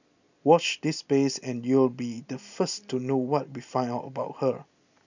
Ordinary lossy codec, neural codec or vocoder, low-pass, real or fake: none; none; 7.2 kHz; real